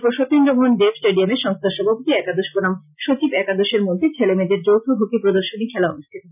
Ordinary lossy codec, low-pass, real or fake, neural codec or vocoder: none; 3.6 kHz; real; none